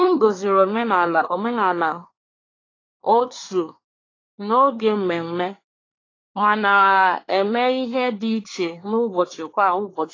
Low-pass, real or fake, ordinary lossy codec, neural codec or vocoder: 7.2 kHz; fake; AAC, 32 kbps; codec, 24 kHz, 1 kbps, SNAC